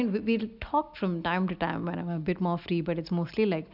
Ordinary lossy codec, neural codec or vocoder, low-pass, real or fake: none; none; 5.4 kHz; real